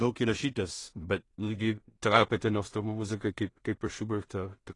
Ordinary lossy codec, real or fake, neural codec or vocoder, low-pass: AAC, 32 kbps; fake; codec, 16 kHz in and 24 kHz out, 0.4 kbps, LongCat-Audio-Codec, two codebook decoder; 10.8 kHz